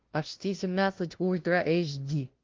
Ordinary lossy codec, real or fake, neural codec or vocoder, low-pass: Opus, 16 kbps; fake; codec, 16 kHz, 0.5 kbps, FunCodec, trained on LibriTTS, 25 frames a second; 7.2 kHz